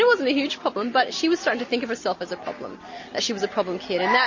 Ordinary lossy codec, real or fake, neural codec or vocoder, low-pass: MP3, 32 kbps; real; none; 7.2 kHz